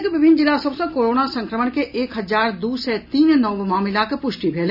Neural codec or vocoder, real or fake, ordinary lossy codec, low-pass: none; real; none; 5.4 kHz